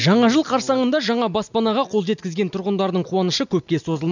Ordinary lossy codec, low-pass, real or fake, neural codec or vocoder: none; 7.2 kHz; real; none